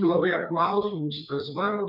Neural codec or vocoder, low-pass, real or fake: codec, 16 kHz, 2 kbps, FreqCodec, larger model; 5.4 kHz; fake